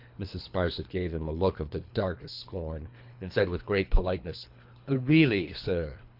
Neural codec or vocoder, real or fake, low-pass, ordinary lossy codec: codec, 24 kHz, 3 kbps, HILCodec; fake; 5.4 kHz; MP3, 48 kbps